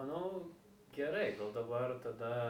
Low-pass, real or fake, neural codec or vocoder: 19.8 kHz; real; none